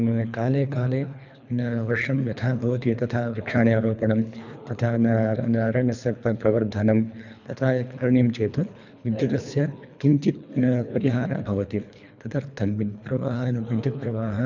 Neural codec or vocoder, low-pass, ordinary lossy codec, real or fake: codec, 24 kHz, 3 kbps, HILCodec; 7.2 kHz; none; fake